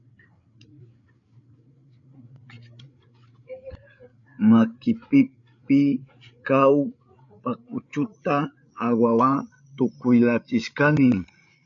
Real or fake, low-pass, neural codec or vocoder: fake; 7.2 kHz; codec, 16 kHz, 8 kbps, FreqCodec, larger model